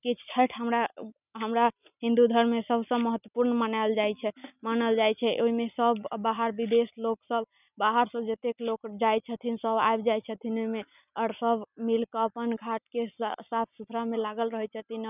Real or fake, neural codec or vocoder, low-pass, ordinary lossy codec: real; none; 3.6 kHz; none